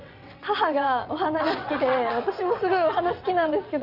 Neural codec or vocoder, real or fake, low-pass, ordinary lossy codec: vocoder, 22.05 kHz, 80 mel bands, WaveNeXt; fake; 5.4 kHz; none